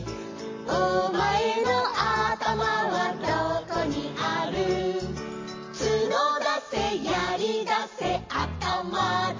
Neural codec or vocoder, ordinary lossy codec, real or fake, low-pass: vocoder, 44.1 kHz, 128 mel bands every 512 samples, BigVGAN v2; MP3, 32 kbps; fake; 7.2 kHz